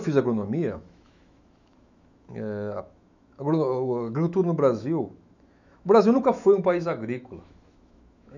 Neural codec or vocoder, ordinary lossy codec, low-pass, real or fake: autoencoder, 48 kHz, 128 numbers a frame, DAC-VAE, trained on Japanese speech; none; 7.2 kHz; fake